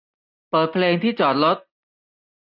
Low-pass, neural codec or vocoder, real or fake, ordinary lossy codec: 5.4 kHz; none; real; none